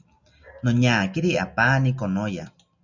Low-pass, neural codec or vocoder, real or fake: 7.2 kHz; none; real